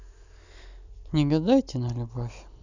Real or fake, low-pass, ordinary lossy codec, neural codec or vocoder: fake; 7.2 kHz; none; vocoder, 44.1 kHz, 128 mel bands every 256 samples, BigVGAN v2